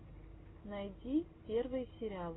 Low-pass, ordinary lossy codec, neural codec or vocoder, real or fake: 7.2 kHz; AAC, 16 kbps; none; real